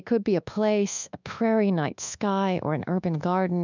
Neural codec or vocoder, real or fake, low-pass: codec, 24 kHz, 1.2 kbps, DualCodec; fake; 7.2 kHz